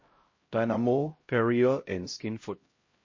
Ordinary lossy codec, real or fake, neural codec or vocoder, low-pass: MP3, 32 kbps; fake; codec, 16 kHz, 0.5 kbps, X-Codec, HuBERT features, trained on LibriSpeech; 7.2 kHz